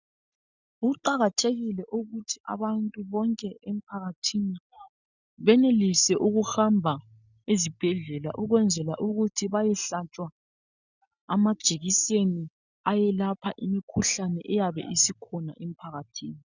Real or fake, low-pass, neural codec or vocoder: real; 7.2 kHz; none